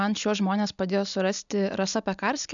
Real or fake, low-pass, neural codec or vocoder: real; 7.2 kHz; none